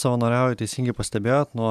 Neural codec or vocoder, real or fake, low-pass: none; real; 14.4 kHz